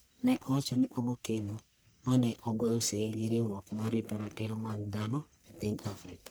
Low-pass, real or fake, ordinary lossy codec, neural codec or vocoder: none; fake; none; codec, 44.1 kHz, 1.7 kbps, Pupu-Codec